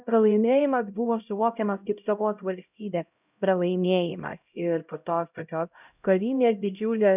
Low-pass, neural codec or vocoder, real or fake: 3.6 kHz; codec, 16 kHz, 0.5 kbps, X-Codec, HuBERT features, trained on LibriSpeech; fake